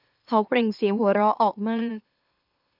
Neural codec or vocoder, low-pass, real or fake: autoencoder, 44.1 kHz, a latent of 192 numbers a frame, MeloTTS; 5.4 kHz; fake